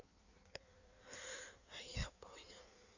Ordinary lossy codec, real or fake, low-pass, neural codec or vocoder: none; fake; 7.2 kHz; codec, 16 kHz in and 24 kHz out, 1.1 kbps, FireRedTTS-2 codec